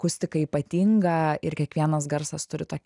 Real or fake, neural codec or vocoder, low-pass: real; none; 10.8 kHz